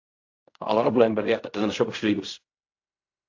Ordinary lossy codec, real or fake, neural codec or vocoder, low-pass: AAC, 48 kbps; fake; codec, 16 kHz in and 24 kHz out, 0.4 kbps, LongCat-Audio-Codec, fine tuned four codebook decoder; 7.2 kHz